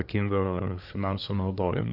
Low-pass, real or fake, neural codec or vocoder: 5.4 kHz; fake; codec, 24 kHz, 1 kbps, SNAC